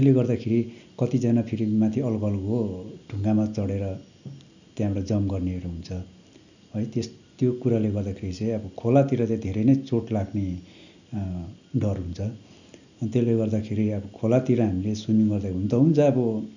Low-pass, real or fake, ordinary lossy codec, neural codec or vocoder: 7.2 kHz; real; none; none